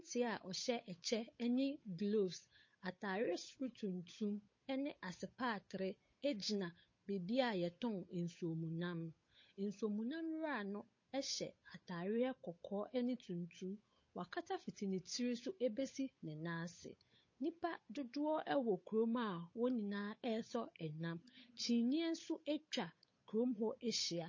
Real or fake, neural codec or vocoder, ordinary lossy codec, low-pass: fake; codec, 16 kHz, 8 kbps, FunCodec, trained on Chinese and English, 25 frames a second; MP3, 32 kbps; 7.2 kHz